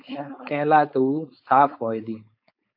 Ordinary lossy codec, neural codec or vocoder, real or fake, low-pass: AAC, 48 kbps; codec, 16 kHz, 4.8 kbps, FACodec; fake; 5.4 kHz